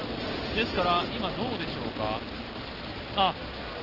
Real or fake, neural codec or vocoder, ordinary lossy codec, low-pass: real; none; Opus, 16 kbps; 5.4 kHz